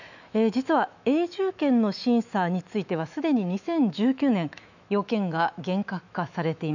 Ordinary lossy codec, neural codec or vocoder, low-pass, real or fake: none; autoencoder, 48 kHz, 128 numbers a frame, DAC-VAE, trained on Japanese speech; 7.2 kHz; fake